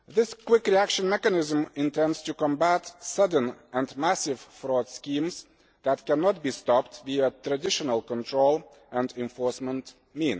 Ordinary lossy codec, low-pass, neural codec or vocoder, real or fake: none; none; none; real